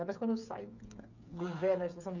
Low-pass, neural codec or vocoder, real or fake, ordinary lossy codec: 7.2 kHz; codec, 16 kHz, 8 kbps, FreqCodec, smaller model; fake; none